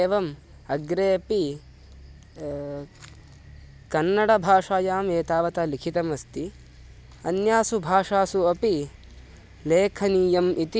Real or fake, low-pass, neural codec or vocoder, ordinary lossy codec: real; none; none; none